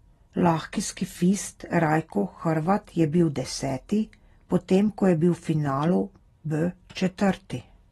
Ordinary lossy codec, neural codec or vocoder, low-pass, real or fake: AAC, 32 kbps; none; 19.8 kHz; real